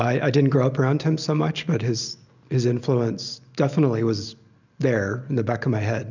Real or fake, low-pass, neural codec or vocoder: real; 7.2 kHz; none